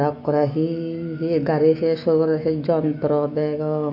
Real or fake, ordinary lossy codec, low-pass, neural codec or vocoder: fake; MP3, 48 kbps; 5.4 kHz; autoencoder, 48 kHz, 128 numbers a frame, DAC-VAE, trained on Japanese speech